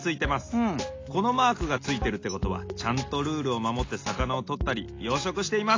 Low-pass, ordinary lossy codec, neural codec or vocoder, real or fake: 7.2 kHz; AAC, 32 kbps; none; real